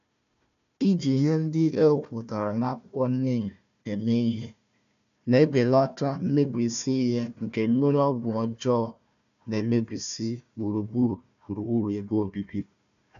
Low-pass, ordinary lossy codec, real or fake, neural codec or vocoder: 7.2 kHz; AAC, 96 kbps; fake; codec, 16 kHz, 1 kbps, FunCodec, trained on Chinese and English, 50 frames a second